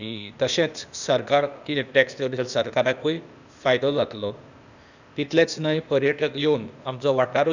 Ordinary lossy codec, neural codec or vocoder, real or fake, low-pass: none; codec, 16 kHz, 0.8 kbps, ZipCodec; fake; 7.2 kHz